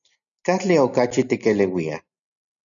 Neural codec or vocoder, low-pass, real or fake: none; 7.2 kHz; real